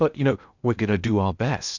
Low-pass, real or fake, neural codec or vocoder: 7.2 kHz; fake; codec, 16 kHz in and 24 kHz out, 0.8 kbps, FocalCodec, streaming, 65536 codes